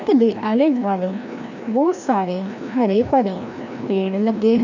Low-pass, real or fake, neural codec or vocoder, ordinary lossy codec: 7.2 kHz; fake; codec, 16 kHz, 1 kbps, FreqCodec, larger model; none